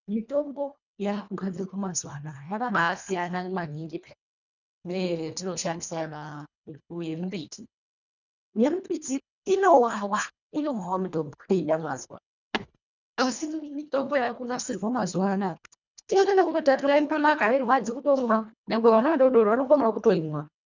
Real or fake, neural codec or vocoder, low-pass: fake; codec, 24 kHz, 1.5 kbps, HILCodec; 7.2 kHz